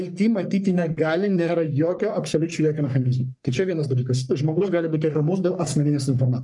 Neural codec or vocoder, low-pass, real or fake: codec, 44.1 kHz, 3.4 kbps, Pupu-Codec; 10.8 kHz; fake